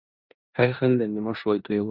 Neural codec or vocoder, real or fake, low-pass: codec, 16 kHz in and 24 kHz out, 0.9 kbps, LongCat-Audio-Codec, four codebook decoder; fake; 5.4 kHz